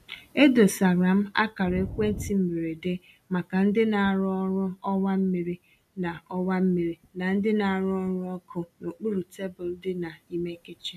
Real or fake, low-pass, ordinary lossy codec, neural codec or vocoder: real; 14.4 kHz; AAC, 96 kbps; none